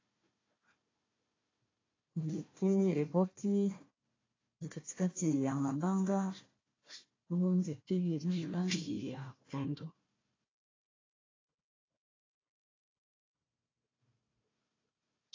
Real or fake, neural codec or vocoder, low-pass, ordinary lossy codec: fake; codec, 16 kHz, 1 kbps, FunCodec, trained on Chinese and English, 50 frames a second; 7.2 kHz; AAC, 32 kbps